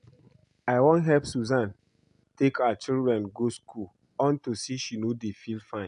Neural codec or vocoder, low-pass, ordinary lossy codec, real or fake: none; 10.8 kHz; AAC, 96 kbps; real